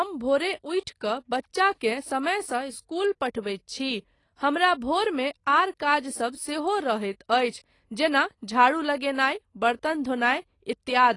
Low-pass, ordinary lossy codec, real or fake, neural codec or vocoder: 10.8 kHz; AAC, 32 kbps; real; none